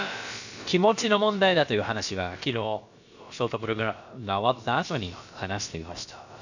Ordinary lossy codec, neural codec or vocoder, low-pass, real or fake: none; codec, 16 kHz, about 1 kbps, DyCAST, with the encoder's durations; 7.2 kHz; fake